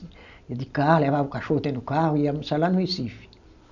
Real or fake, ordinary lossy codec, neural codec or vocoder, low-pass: real; none; none; 7.2 kHz